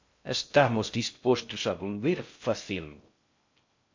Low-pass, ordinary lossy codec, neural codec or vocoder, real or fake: 7.2 kHz; MP3, 48 kbps; codec, 16 kHz in and 24 kHz out, 0.6 kbps, FocalCodec, streaming, 2048 codes; fake